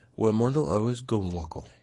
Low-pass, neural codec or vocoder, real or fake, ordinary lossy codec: 10.8 kHz; codec, 24 kHz, 0.9 kbps, WavTokenizer, small release; fake; AAC, 32 kbps